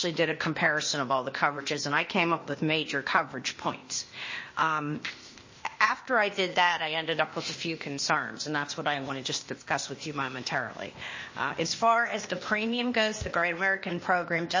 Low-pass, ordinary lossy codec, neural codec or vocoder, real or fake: 7.2 kHz; MP3, 32 kbps; codec, 16 kHz, 0.8 kbps, ZipCodec; fake